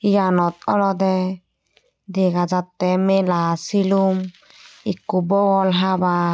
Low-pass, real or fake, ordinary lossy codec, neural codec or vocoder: none; real; none; none